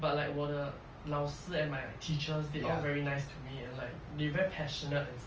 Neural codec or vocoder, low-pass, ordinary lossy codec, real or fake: none; 7.2 kHz; Opus, 24 kbps; real